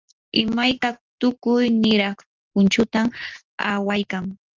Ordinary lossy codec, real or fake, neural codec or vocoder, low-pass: Opus, 32 kbps; real; none; 7.2 kHz